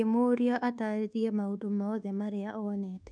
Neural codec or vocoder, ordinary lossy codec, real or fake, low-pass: codec, 24 kHz, 1.2 kbps, DualCodec; none; fake; 9.9 kHz